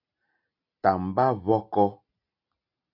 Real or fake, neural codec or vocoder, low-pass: real; none; 5.4 kHz